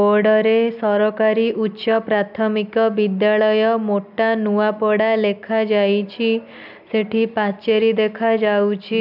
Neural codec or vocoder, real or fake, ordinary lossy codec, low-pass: none; real; none; 5.4 kHz